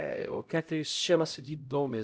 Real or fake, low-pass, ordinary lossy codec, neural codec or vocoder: fake; none; none; codec, 16 kHz, 0.5 kbps, X-Codec, HuBERT features, trained on LibriSpeech